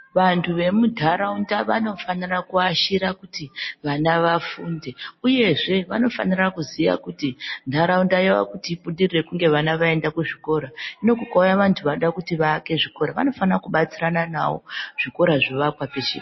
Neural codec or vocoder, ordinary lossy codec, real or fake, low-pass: none; MP3, 24 kbps; real; 7.2 kHz